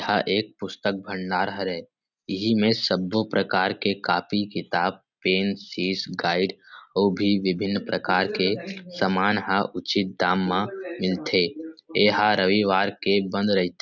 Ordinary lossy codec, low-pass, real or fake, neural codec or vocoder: none; 7.2 kHz; real; none